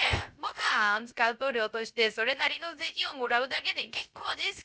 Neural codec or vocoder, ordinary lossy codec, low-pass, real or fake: codec, 16 kHz, 0.3 kbps, FocalCodec; none; none; fake